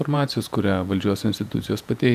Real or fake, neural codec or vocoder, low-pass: fake; vocoder, 48 kHz, 128 mel bands, Vocos; 14.4 kHz